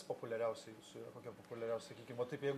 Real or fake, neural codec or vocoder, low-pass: real; none; 14.4 kHz